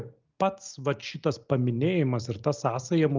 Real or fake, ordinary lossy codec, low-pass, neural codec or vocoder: real; Opus, 24 kbps; 7.2 kHz; none